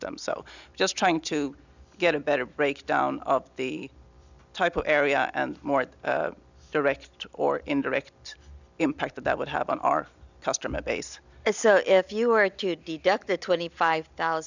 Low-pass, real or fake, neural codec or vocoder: 7.2 kHz; real; none